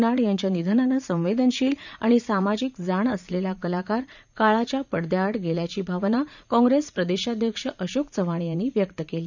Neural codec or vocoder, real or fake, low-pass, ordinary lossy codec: vocoder, 44.1 kHz, 80 mel bands, Vocos; fake; 7.2 kHz; none